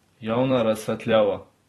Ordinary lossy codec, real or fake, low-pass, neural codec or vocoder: AAC, 32 kbps; fake; 19.8 kHz; vocoder, 44.1 kHz, 128 mel bands every 256 samples, BigVGAN v2